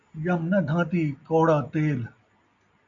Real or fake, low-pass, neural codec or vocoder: real; 7.2 kHz; none